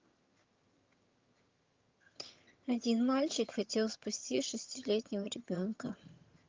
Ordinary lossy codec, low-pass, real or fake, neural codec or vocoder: Opus, 32 kbps; 7.2 kHz; fake; vocoder, 22.05 kHz, 80 mel bands, HiFi-GAN